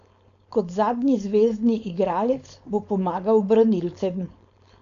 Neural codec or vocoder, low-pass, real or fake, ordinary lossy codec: codec, 16 kHz, 4.8 kbps, FACodec; 7.2 kHz; fake; none